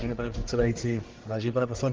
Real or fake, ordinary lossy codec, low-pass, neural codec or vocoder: fake; Opus, 16 kbps; 7.2 kHz; codec, 44.1 kHz, 1.7 kbps, Pupu-Codec